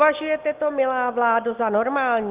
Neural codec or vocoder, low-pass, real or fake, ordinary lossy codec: none; 3.6 kHz; real; Opus, 32 kbps